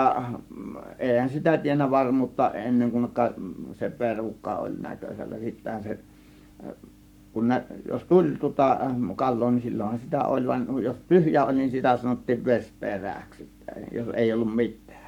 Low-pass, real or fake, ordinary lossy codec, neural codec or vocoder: 19.8 kHz; fake; none; codec, 44.1 kHz, 7.8 kbps, Pupu-Codec